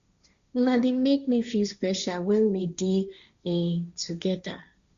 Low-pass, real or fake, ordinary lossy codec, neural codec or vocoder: 7.2 kHz; fake; Opus, 64 kbps; codec, 16 kHz, 1.1 kbps, Voila-Tokenizer